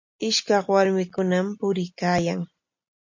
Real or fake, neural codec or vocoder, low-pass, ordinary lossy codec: real; none; 7.2 kHz; MP3, 48 kbps